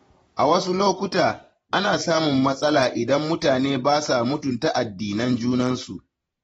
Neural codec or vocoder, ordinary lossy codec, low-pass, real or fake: vocoder, 48 kHz, 128 mel bands, Vocos; AAC, 24 kbps; 19.8 kHz; fake